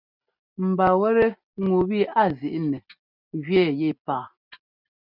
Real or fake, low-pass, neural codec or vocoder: real; 5.4 kHz; none